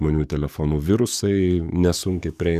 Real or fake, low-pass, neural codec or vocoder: fake; 14.4 kHz; codec, 44.1 kHz, 7.8 kbps, DAC